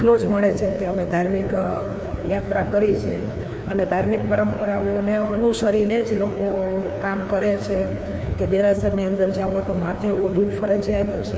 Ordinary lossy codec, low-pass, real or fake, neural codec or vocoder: none; none; fake; codec, 16 kHz, 2 kbps, FreqCodec, larger model